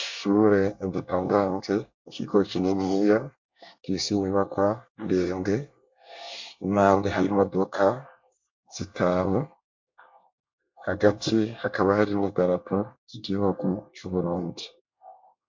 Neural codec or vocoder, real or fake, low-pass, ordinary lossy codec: codec, 24 kHz, 1 kbps, SNAC; fake; 7.2 kHz; MP3, 48 kbps